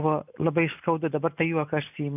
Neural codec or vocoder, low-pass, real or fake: none; 3.6 kHz; real